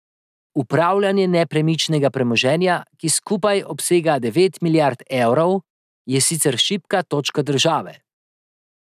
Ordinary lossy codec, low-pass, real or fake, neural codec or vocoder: none; 14.4 kHz; real; none